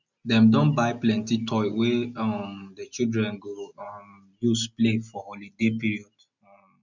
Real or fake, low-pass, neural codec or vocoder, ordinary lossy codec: real; 7.2 kHz; none; none